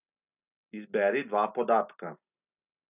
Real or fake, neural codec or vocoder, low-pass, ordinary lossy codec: real; none; 3.6 kHz; none